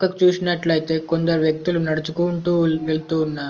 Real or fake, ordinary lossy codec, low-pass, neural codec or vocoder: real; Opus, 24 kbps; 7.2 kHz; none